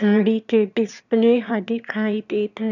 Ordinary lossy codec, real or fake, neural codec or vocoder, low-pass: none; fake; autoencoder, 22.05 kHz, a latent of 192 numbers a frame, VITS, trained on one speaker; 7.2 kHz